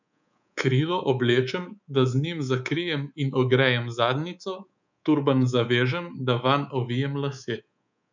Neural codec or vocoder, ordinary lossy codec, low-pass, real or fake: codec, 24 kHz, 3.1 kbps, DualCodec; none; 7.2 kHz; fake